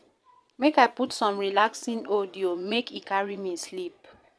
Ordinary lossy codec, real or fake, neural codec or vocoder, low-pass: none; fake; vocoder, 22.05 kHz, 80 mel bands, Vocos; none